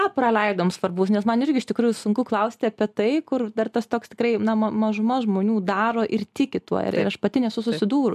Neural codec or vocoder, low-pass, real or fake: none; 14.4 kHz; real